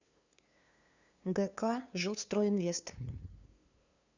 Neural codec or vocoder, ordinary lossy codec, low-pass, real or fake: codec, 16 kHz, 2 kbps, FunCodec, trained on LibriTTS, 25 frames a second; Opus, 64 kbps; 7.2 kHz; fake